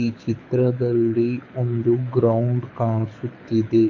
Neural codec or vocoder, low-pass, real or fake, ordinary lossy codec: codec, 24 kHz, 6 kbps, HILCodec; 7.2 kHz; fake; MP3, 48 kbps